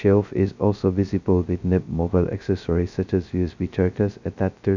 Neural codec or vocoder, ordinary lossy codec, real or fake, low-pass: codec, 16 kHz, 0.2 kbps, FocalCodec; none; fake; 7.2 kHz